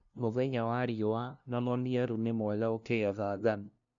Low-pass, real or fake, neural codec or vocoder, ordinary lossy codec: 7.2 kHz; fake; codec, 16 kHz, 0.5 kbps, FunCodec, trained on LibriTTS, 25 frames a second; none